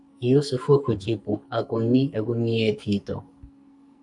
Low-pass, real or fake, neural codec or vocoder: 10.8 kHz; fake; codec, 44.1 kHz, 2.6 kbps, SNAC